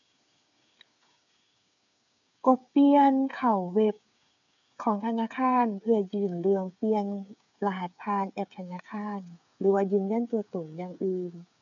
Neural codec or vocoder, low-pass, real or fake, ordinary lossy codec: codec, 16 kHz, 8 kbps, FreqCodec, smaller model; 7.2 kHz; fake; none